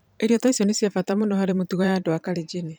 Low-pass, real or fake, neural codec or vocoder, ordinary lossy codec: none; fake; vocoder, 44.1 kHz, 128 mel bands every 512 samples, BigVGAN v2; none